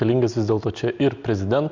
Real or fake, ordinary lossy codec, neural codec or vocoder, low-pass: real; MP3, 64 kbps; none; 7.2 kHz